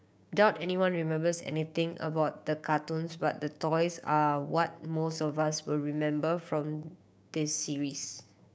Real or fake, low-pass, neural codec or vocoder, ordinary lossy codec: fake; none; codec, 16 kHz, 6 kbps, DAC; none